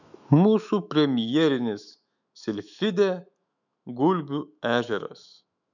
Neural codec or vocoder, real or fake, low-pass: autoencoder, 48 kHz, 128 numbers a frame, DAC-VAE, trained on Japanese speech; fake; 7.2 kHz